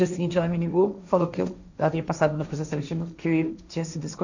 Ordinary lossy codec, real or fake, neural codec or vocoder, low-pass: none; fake; codec, 16 kHz, 1.1 kbps, Voila-Tokenizer; 7.2 kHz